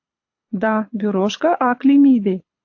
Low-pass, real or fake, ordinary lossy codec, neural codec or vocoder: 7.2 kHz; fake; AAC, 48 kbps; codec, 24 kHz, 6 kbps, HILCodec